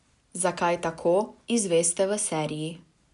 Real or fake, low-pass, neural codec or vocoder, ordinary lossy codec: real; 10.8 kHz; none; none